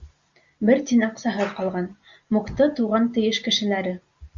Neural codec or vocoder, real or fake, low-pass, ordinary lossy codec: none; real; 7.2 kHz; Opus, 64 kbps